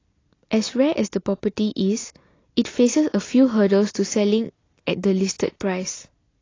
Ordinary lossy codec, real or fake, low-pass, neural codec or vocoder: AAC, 32 kbps; real; 7.2 kHz; none